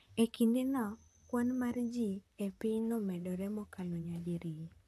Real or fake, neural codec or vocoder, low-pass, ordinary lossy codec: fake; vocoder, 44.1 kHz, 128 mel bands, Pupu-Vocoder; 14.4 kHz; none